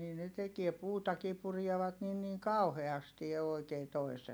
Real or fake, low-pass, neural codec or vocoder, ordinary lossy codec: real; none; none; none